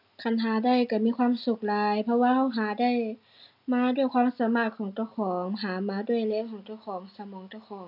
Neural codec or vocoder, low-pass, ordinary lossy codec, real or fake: none; 5.4 kHz; none; real